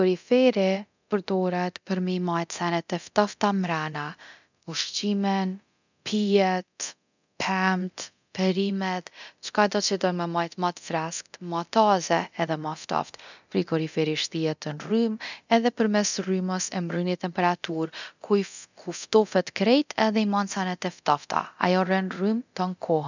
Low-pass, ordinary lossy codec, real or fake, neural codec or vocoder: 7.2 kHz; none; fake; codec, 24 kHz, 0.9 kbps, DualCodec